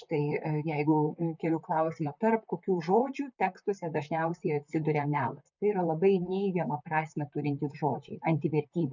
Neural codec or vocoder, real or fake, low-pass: vocoder, 44.1 kHz, 128 mel bands, Pupu-Vocoder; fake; 7.2 kHz